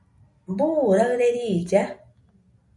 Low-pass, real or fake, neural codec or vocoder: 10.8 kHz; real; none